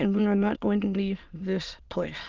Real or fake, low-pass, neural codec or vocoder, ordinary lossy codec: fake; 7.2 kHz; autoencoder, 22.05 kHz, a latent of 192 numbers a frame, VITS, trained on many speakers; Opus, 24 kbps